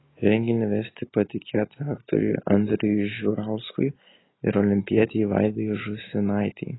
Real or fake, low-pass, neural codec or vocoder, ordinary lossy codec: real; 7.2 kHz; none; AAC, 16 kbps